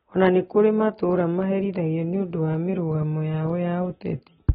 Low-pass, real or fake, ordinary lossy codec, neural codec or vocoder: 7.2 kHz; real; AAC, 16 kbps; none